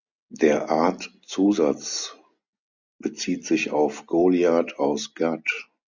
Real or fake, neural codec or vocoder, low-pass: real; none; 7.2 kHz